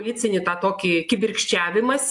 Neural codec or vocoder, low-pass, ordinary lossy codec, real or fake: none; 10.8 kHz; AAC, 64 kbps; real